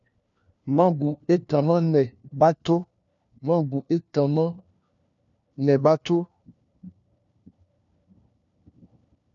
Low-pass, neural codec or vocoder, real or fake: 7.2 kHz; codec, 16 kHz, 1 kbps, FunCodec, trained on LibriTTS, 50 frames a second; fake